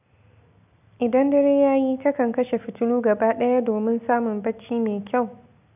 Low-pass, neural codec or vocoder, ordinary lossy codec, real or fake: 3.6 kHz; none; none; real